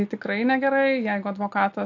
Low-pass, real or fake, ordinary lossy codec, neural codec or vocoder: 7.2 kHz; real; AAC, 48 kbps; none